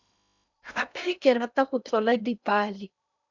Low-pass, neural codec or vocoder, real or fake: 7.2 kHz; codec, 16 kHz in and 24 kHz out, 0.8 kbps, FocalCodec, streaming, 65536 codes; fake